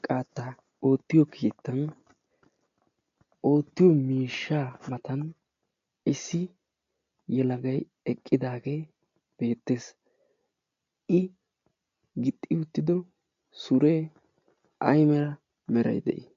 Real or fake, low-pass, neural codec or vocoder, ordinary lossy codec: real; 7.2 kHz; none; AAC, 48 kbps